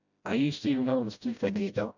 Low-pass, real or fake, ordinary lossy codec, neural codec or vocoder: 7.2 kHz; fake; none; codec, 16 kHz, 0.5 kbps, FreqCodec, smaller model